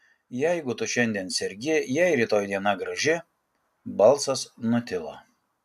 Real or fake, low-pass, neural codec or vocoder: real; 14.4 kHz; none